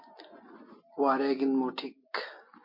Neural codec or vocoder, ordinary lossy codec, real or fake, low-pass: none; MP3, 32 kbps; real; 5.4 kHz